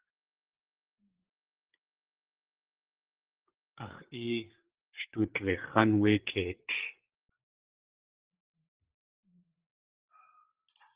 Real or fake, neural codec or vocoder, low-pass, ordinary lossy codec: fake; codec, 16 kHz, 8 kbps, FreqCodec, larger model; 3.6 kHz; Opus, 16 kbps